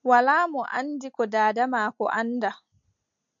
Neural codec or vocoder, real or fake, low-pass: none; real; 7.2 kHz